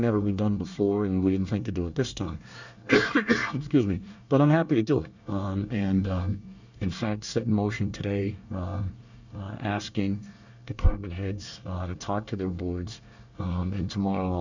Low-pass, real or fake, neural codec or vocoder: 7.2 kHz; fake; codec, 24 kHz, 1 kbps, SNAC